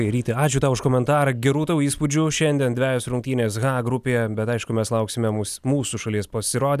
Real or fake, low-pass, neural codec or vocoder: real; 14.4 kHz; none